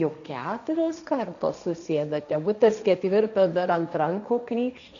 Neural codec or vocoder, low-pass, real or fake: codec, 16 kHz, 1.1 kbps, Voila-Tokenizer; 7.2 kHz; fake